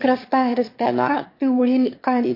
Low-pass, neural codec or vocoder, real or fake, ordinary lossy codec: 5.4 kHz; autoencoder, 22.05 kHz, a latent of 192 numbers a frame, VITS, trained on one speaker; fake; MP3, 32 kbps